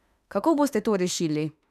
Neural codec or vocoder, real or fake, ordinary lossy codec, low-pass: autoencoder, 48 kHz, 32 numbers a frame, DAC-VAE, trained on Japanese speech; fake; none; 14.4 kHz